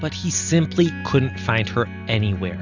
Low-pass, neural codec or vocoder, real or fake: 7.2 kHz; none; real